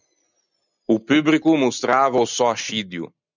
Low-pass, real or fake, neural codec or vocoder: 7.2 kHz; real; none